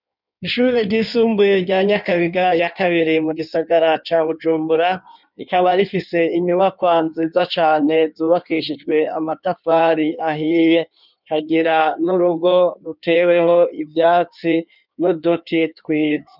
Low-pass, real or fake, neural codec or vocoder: 5.4 kHz; fake; codec, 16 kHz in and 24 kHz out, 1.1 kbps, FireRedTTS-2 codec